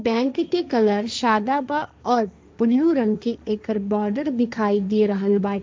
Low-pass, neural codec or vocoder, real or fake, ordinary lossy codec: none; codec, 16 kHz, 1.1 kbps, Voila-Tokenizer; fake; none